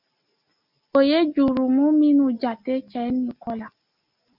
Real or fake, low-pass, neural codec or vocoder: real; 5.4 kHz; none